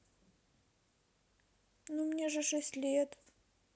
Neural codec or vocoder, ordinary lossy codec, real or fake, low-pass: none; none; real; none